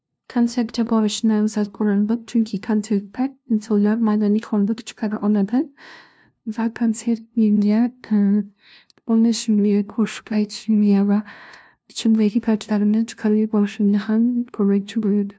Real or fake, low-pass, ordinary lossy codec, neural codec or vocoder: fake; none; none; codec, 16 kHz, 0.5 kbps, FunCodec, trained on LibriTTS, 25 frames a second